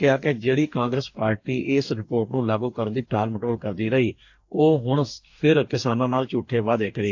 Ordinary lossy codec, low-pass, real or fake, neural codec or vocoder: AAC, 48 kbps; 7.2 kHz; fake; codec, 44.1 kHz, 2.6 kbps, DAC